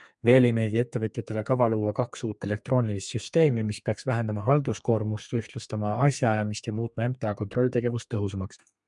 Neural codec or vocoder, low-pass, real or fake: codec, 32 kHz, 1.9 kbps, SNAC; 10.8 kHz; fake